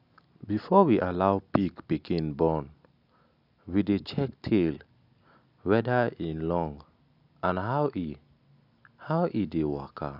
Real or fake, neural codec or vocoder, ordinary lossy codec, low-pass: real; none; none; 5.4 kHz